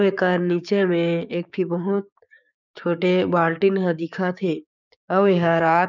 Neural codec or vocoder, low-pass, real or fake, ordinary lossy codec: codec, 16 kHz, 6 kbps, DAC; 7.2 kHz; fake; none